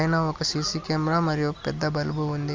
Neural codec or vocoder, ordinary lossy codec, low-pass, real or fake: none; Opus, 32 kbps; 7.2 kHz; real